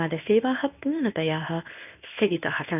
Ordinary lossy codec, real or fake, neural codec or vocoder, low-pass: none; fake; codec, 24 kHz, 0.9 kbps, WavTokenizer, medium speech release version 1; 3.6 kHz